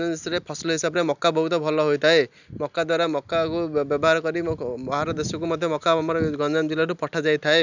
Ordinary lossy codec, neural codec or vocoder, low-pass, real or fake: none; none; 7.2 kHz; real